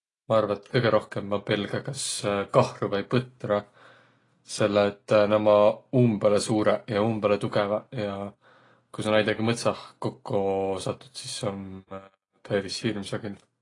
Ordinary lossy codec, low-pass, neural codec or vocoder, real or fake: AAC, 32 kbps; 10.8 kHz; none; real